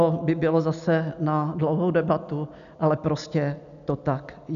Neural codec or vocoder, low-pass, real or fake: none; 7.2 kHz; real